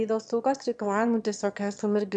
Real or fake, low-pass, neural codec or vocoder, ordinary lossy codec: fake; 9.9 kHz; autoencoder, 22.05 kHz, a latent of 192 numbers a frame, VITS, trained on one speaker; AAC, 64 kbps